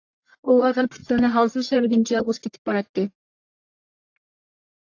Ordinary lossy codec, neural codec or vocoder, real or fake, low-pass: AAC, 48 kbps; codec, 44.1 kHz, 1.7 kbps, Pupu-Codec; fake; 7.2 kHz